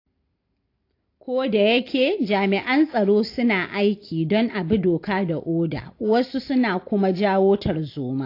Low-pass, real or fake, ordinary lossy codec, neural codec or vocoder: 5.4 kHz; real; AAC, 32 kbps; none